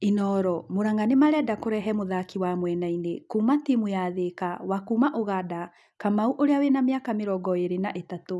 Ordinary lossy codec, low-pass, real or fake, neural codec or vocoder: none; none; real; none